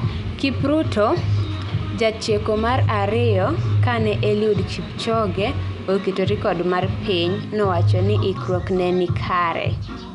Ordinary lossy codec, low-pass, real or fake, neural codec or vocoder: none; 10.8 kHz; real; none